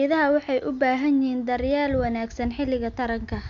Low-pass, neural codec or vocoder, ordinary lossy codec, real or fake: 7.2 kHz; none; MP3, 64 kbps; real